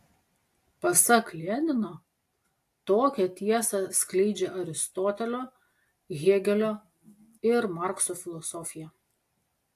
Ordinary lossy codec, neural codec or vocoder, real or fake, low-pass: AAC, 64 kbps; none; real; 14.4 kHz